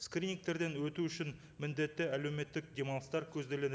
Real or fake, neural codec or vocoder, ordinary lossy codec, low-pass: real; none; none; none